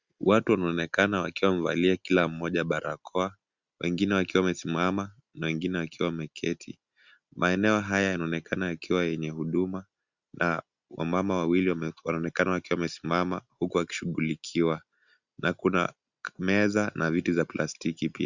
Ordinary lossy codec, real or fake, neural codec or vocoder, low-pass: Opus, 64 kbps; real; none; 7.2 kHz